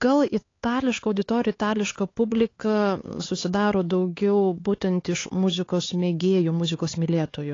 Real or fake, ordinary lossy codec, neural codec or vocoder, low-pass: fake; AAC, 32 kbps; codec, 16 kHz, 4 kbps, X-Codec, HuBERT features, trained on LibriSpeech; 7.2 kHz